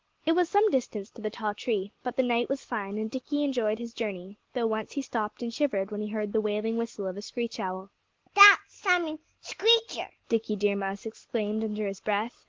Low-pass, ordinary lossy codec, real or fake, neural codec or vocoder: 7.2 kHz; Opus, 16 kbps; real; none